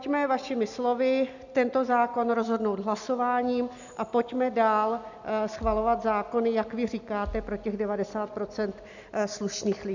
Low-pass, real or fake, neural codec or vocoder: 7.2 kHz; real; none